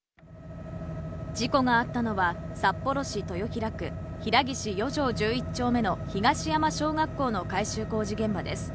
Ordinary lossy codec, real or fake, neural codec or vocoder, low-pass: none; real; none; none